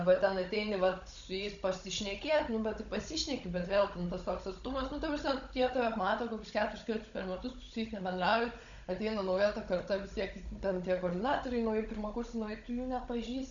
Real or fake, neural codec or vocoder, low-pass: fake; codec, 16 kHz, 16 kbps, FunCodec, trained on Chinese and English, 50 frames a second; 7.2 kHz